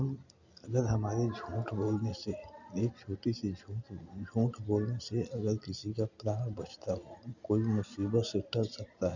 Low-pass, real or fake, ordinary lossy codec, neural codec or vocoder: 7.2 kHz; real; none; none